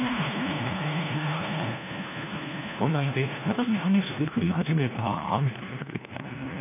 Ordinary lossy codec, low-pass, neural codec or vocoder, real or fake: none; 3.6 kHz; codec, 16 kHz, 1 kbps, FunCodec, trained on LibriTTS, 50 frames a second; fake